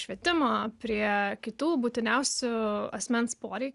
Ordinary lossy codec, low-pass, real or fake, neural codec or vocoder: Opus, 64 kbps; 10.8 kHz; real; none